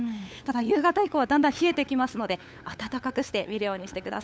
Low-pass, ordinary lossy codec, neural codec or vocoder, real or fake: none; none; codec, 16 kHz, 8 kbps, FunCodec, trained on LibriTTS, 25 frames a second; fake